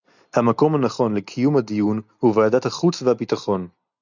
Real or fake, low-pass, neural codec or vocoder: real; 7.2 kHz; none